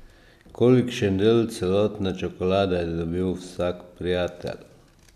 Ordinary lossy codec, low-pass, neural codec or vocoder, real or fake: none; 14.4 kHz; none; real